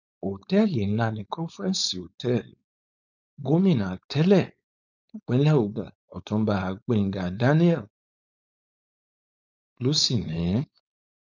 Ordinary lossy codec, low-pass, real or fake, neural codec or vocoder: none; 7.2 kHz; fake; codec, 16 kHz, 4.8 kbps, FACodec